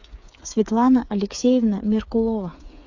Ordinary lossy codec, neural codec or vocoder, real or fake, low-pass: AAC, 48 kbps; codec, 16 kHz, 8 kbps, FreqCodec, smaller model; fake; 7.2 kHz